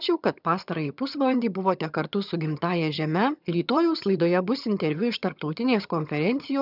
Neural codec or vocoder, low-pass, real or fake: vocoder, 22.05 kHz, 80 mel bands, HiFi-GAN; 5.4 kHz; fake